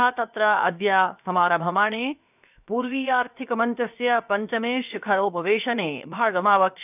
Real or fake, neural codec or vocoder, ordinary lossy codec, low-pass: fake; codec, 16 kHz, 0.7 kbps, FocalCodec; none; 3.6 kHz